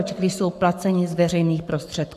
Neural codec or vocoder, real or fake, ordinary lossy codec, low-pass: codec, 44.1 kHz, 7.8 kbps, Pupu-Codec; fake; AAC, 96 kbps; 14.4 kHz